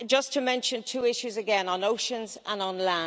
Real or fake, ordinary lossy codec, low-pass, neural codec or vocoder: real; none; none; none